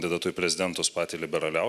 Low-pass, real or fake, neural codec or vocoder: 14.4 kHz; real; none